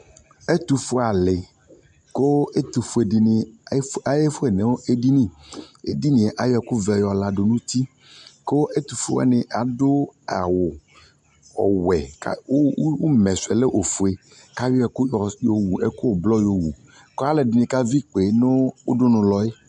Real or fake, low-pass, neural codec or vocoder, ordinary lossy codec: real; 10.8 kHz; none; MP3, 64 kbps